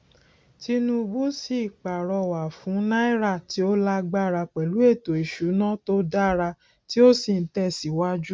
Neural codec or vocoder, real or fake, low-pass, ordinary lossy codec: none; real; none; none